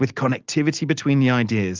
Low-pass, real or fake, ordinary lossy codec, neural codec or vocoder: 7.2 kHz; real; Opus, 32 kbps; none